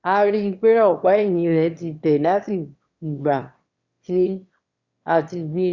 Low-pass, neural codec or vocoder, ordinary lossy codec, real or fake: 7.2 kHz; autoencoder, 22.05 kHz, a latent of 192 numbers a frame, VITS, trained on one speaker; Opus, 64 kbps; fake